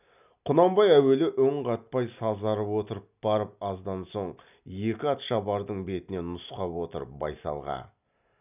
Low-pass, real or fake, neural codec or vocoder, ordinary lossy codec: 3.6 kHz; real; none; none